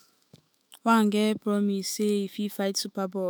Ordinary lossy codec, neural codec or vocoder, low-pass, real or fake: none; autoencoder, 48 kHz, 128 numbers a frame, DAC-VAE, trained on Japanese speech; none; fake